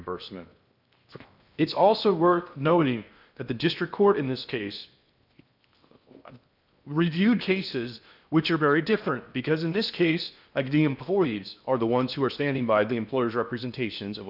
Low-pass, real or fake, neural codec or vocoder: 5.4 kHz; fake; codec, 16 kHz in and 24 kHz out, 0.6 kbps, FocalCodec, streaming, 2048 codes